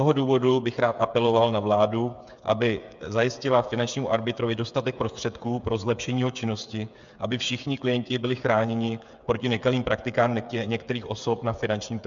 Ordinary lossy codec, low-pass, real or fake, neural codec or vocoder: MP3, 64 kbps; 7.2 kHz; fake; codec, 16 kHz, 8 kbps, FreqCodec, smaller model